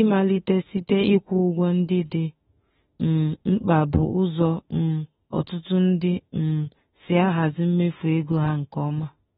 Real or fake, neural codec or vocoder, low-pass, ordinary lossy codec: fake; autoencoder, 48 kHz, 32 numbers a frame, DAC-VAE, trained on Japanese speech; 19.8 kHz; AAC, 16 kbps